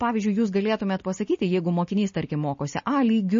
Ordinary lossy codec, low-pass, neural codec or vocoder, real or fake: MP3, 32 kbps; 7.2 kHz; none; real